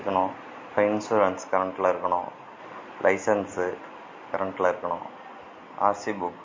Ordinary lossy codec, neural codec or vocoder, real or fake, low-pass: MP3, 32 kbps; none; real; 7.2 kHz